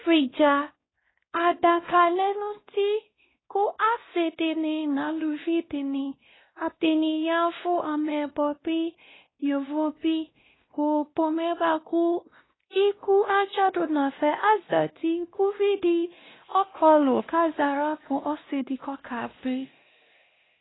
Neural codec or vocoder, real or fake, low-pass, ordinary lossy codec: codec, 16 kHz, 1 kbps, X-Codec, WavLM features, trained on Multilingual LibriSpeech; fake; 7.2 kHz; AAC, 16 kbps